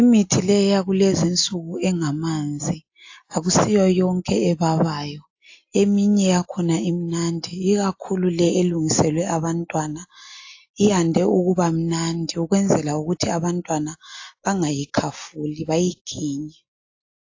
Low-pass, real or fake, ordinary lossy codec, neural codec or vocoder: 7.2 kHz; real; AAC, 48 kbps; none